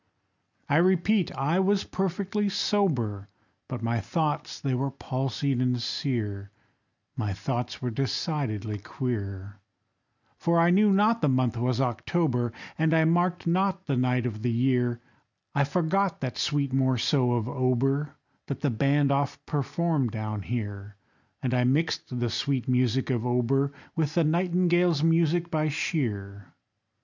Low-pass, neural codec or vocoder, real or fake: 7.2 kHz; none; real